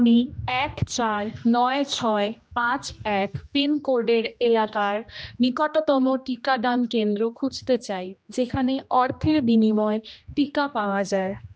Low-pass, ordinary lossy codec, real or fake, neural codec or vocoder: none; none; fake; codec, 16 kHz, 1 kbps, X-Codec, HuBERT features, trained on general audio